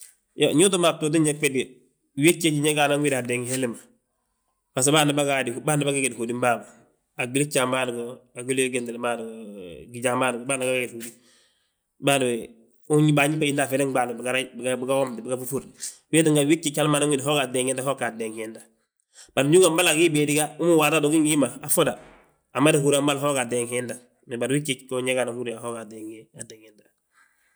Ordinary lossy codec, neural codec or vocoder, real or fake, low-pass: none; none; real; none